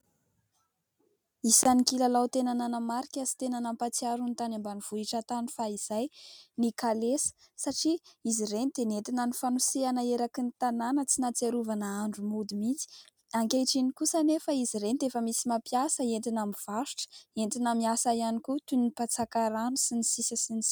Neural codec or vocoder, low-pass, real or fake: none; 19.8 kHz; real